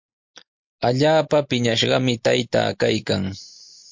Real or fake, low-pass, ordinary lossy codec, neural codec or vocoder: real; 7.2 kHz; MP3, 48 kbps; none